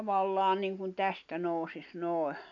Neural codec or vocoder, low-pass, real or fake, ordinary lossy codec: none; 7.2 kHz; real; none